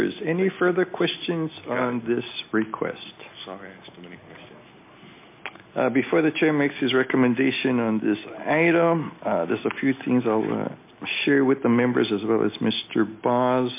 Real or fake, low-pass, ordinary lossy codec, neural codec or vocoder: real; 3.6 kHz; MP3, 24 kbps; none